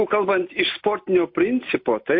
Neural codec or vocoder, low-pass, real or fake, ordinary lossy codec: none; 5.4 kHz; real; MP3, 32 kbps